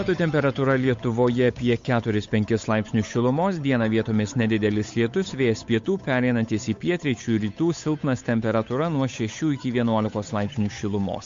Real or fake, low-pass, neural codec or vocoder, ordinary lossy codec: fake; 7.2 kHz; codec, 16 kHz, 8 kbps, FunCodec, trained on Chinese and English, 25 frames a second; MP3, 48 kbps